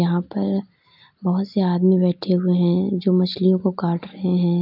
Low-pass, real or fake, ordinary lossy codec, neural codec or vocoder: 5.4 kHz; real; AAC, 48 kbps; none